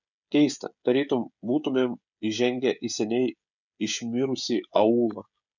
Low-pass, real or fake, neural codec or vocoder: 7.2 kHz; fake; codec, 16 kHz, 16 kbps, FreqCodec, smaller model